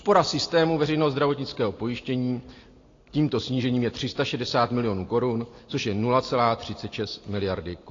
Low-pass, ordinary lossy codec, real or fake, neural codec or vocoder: 7.2 kHz; AAC, 32 kbps; real; none